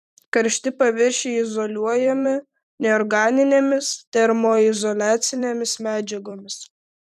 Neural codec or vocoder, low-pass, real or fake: vocoder, 44.1 kHz, 128 mel bands every 256 samples, BigVGAN v2; 14.4 kHz; fake